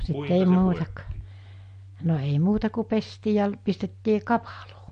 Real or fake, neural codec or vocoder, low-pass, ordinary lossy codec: real; none; 9.9 kHz; MP3, 48 kbps